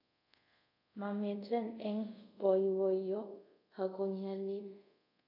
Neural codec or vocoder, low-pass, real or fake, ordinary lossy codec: codec, 24 kHz, 0.5 kbps, DualCodec; 5.4 kHz; fake; none